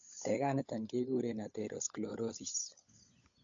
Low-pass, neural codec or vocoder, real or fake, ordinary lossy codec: 7.2 kHz; codec, 16 kHz, 16 kbps, FunCodec, trained on LibriTTS, 50 frames a second; fake; AAC, 48 kbps